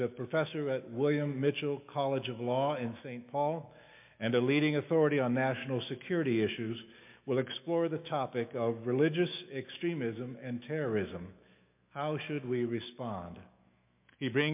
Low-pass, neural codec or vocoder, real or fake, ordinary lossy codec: 3.6 kHz; autoencoder, 48 kHz, 128 numbers a frame, DAC-VAE, trained on Japanese speech; fake; MP3, 32 kbps